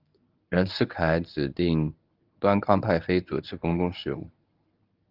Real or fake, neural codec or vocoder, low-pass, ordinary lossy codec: fake; codec, 24 kHz, 0.9 kbps, WavTokenizer, medium speech release version 1; 5.4 kHz; Opus, 24 kbps